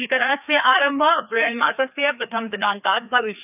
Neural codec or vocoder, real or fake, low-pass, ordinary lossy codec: codec, 16 kHz, 2 kbps, FreqCodec, larger model; fake; 3.6 kHz; none